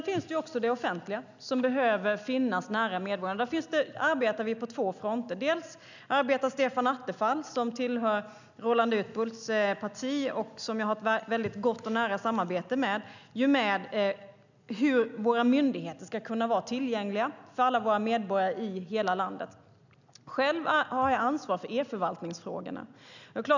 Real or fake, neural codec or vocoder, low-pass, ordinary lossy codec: real; none; 7.2 kHz; none